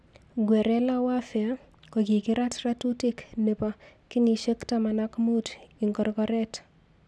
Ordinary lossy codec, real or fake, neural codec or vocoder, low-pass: none; real; none; none